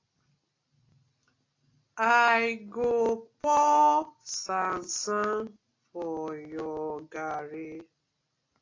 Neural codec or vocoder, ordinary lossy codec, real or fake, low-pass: none; AAC, 32 kbps; real; 7.2 kHz